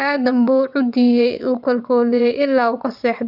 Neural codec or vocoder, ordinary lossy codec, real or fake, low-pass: vocoder, 22.05 kHz, 80 mel bands, WaveNeXt; none; fake; 5.4 kHz